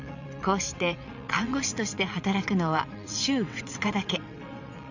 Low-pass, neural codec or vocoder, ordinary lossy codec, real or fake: 7.2 kHz; vocoder, 22.05 kHz, 80 mel bands, WaveNeXt; none; fake